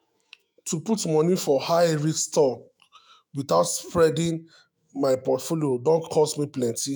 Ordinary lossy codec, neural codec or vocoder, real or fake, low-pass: none; autoencoder, 48 kHz, 128 numbers a frame, DAC-VAE, trained on Japanese speech; fake; none